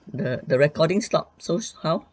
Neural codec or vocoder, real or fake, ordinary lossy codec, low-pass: none; real; none; none